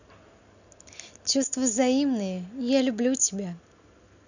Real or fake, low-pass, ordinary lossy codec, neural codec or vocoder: real; 7.2 kHz; none; none